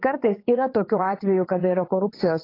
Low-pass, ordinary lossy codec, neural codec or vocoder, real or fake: 5.4 kHz; AAC, 24 kbps; vocoder, 22.05 kHz, 80 mel bands, WaveNeXt; fake